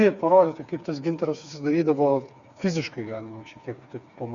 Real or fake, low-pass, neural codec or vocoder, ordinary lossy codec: fake; 7.2 kHz; codec, 16 kHz, 4 kbps, FreqCodec, smaller model; Opus, 64 kbps